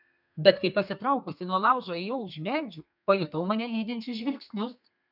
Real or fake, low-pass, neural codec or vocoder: fake; 5.4 kHz; codec, 32 kHz, 1.9 kbps, SNAC